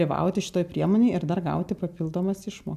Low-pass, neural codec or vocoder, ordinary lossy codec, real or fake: 14.4 kHz; none; MP3, 96 kbps; real